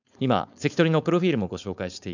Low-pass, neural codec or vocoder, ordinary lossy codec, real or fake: 7.2 kHz; codec, 16 kHz, 4.8 kbps, FACodec; none; fake